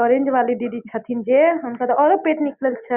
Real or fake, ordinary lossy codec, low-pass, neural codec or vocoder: real; Opus, 64 kbps; 3.6 kHz; none